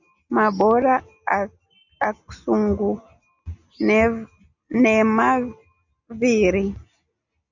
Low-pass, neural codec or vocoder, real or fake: 7.2 kHz; none; real